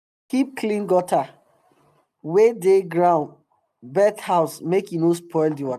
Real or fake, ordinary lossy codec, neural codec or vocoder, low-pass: real; none; none; 14.4 kHz